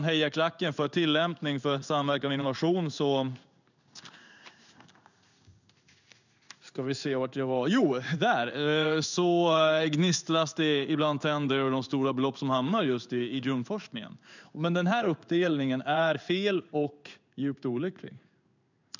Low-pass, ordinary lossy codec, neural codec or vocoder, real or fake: 7.2 kHz; none; codec, 16 kHz in and 24 kHz out, 1 kbps, XY-Tokenizer; fake